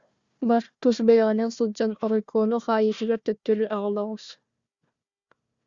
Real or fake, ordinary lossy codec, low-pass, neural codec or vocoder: fake; Opus, 64 kbps; 7.2 kHz; codec, 16 kHz, 1 kbps, FunCodec, trained on Chinese and English, 50 frames a second